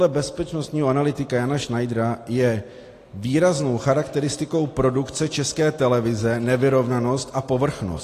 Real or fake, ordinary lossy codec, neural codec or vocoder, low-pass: real; AAC, 48 kbps; none; 14.4 kHz